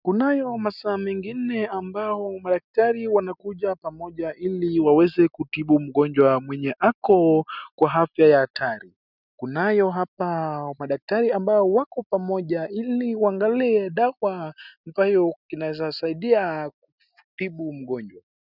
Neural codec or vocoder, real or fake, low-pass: none; real; 5.4 kHz